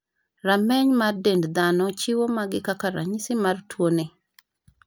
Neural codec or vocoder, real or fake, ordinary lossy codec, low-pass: none; real; none; none